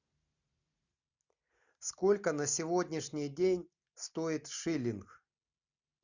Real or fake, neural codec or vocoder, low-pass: real; none; 7.2 kHz